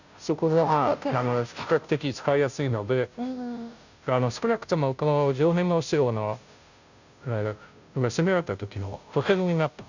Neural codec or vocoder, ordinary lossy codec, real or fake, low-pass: codec, 16 kHz, 0.5 kbps, FunCodec, trained on Chinese and English, 25 frames a second; none; fake; 7.2 kHz